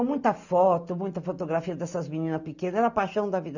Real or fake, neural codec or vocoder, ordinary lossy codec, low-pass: real; none; none; 7.2 kHz